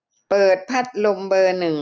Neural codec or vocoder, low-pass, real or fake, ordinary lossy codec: none; none; real; none